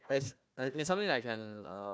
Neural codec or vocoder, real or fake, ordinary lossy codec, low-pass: codec, 16 kHz, 1 kbps, FunCodec, trained on Chinese and English, 50 frames a second; fake; none; none